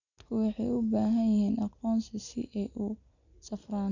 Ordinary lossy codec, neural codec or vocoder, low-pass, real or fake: none; none; 7.2 kHz; real